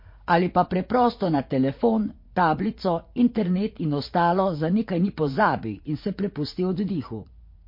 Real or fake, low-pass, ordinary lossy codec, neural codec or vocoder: fake; 5.4 kHz; MP3, 32 kbps; vocoder, 44.1 kHz, 80 mel bands, Vocos